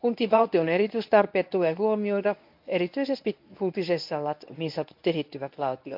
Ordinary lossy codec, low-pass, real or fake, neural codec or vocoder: MP3, 48 kbps; 5.4 kHz; fake; codec, 24 kHz, 0.9 kbps, WavTokenizer, medium speech release version 1